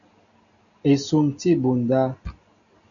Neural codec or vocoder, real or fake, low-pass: none; real; 7.2 kHz